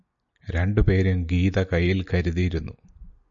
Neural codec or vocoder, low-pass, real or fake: none; 7.2 kHz; real